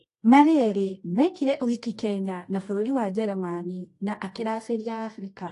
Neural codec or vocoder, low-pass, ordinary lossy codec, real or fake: codec, 24 kHz, 0.9 kbps, WavTokenizer, medium music audio release; 10.8 kHz; AAC, 48 kbps; fake